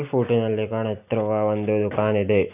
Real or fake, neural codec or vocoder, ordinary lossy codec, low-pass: real; none; none; 3.6 kHz